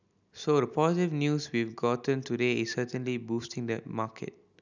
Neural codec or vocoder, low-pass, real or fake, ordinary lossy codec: none; 7.2 kHz; real; none